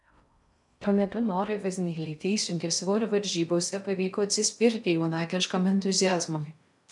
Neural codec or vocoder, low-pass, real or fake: codec, 16 kHz in and 24 kHz out, 0.6 kbps, FocalCodec, streaming, 2048 codes; 10.8 kHz; fake